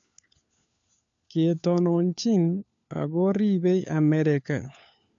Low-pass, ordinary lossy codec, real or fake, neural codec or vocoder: 7.2 kHz; AAC, 64 kbps; fake; codec, 16 kHz, 4 kbps, FunCodec, trained on LibriTTS, 50 frames a second